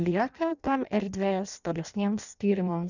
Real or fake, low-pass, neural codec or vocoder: fake; 7.2 kHz; codec, 16 kHz in and 24 kHz out, 0.6 kbps, FireRedTTS-2 codec